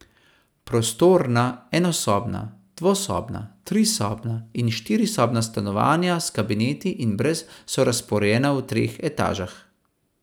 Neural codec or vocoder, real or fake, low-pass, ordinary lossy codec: none; real; none; none